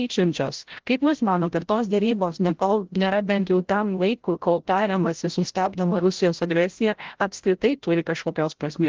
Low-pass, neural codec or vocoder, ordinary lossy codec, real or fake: 7.2 kHz; codec, 16 kHz, 0.5 kbps, FreqCodec, larger model; Opus, 16 kbps; fake